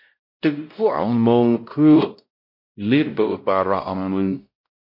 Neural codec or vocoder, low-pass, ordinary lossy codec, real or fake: codec, 16 kHz, 0.5 kbps, X-Codec, WavLM features, trained on Multilingual LibriSpeech; 5.4 kHz; MP3, 32 kbps; fake